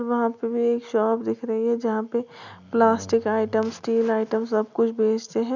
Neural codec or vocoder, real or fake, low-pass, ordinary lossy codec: none; real; 7.2 kHz; none